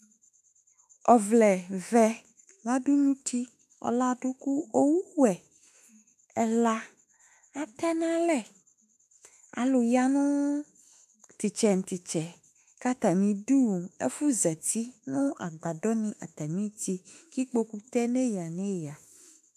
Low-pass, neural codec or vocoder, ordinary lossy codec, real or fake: 14.4 kHz; autoencoder, 48 kHz, 32 numbers a frame, DAC-VAE, trained on Japanese speech; MP3, 96 kbps; fake